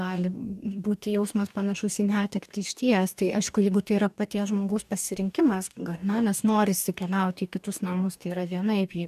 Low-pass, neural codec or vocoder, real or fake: 14.4 kHz; codec, 44.1 kHz, 2.6 kbps, DAC; fake